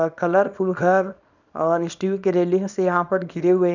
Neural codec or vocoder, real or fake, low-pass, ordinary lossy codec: codec, 24 kHz, 0.9 kbps, WavTokenizer, small release; fake; 7.2 kHz; none